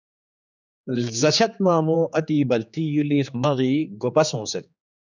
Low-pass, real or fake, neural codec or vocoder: 7.2 kHz; fake; codec, 16 kHz, 4 kbps, X-Codec, HuBERT features, trained on general audio